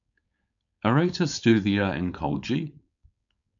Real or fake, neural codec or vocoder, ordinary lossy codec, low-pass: fake; codec, 16 kHz, 4.8 kbps, FACodec; MP3, 64 kbps; 7.2 kHz